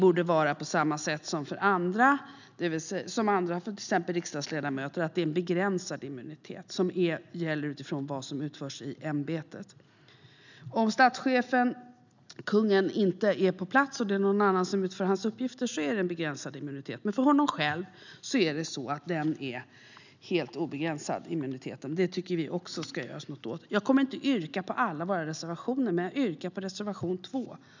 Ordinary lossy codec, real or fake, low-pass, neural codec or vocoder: none; real; 7.2 kHz; none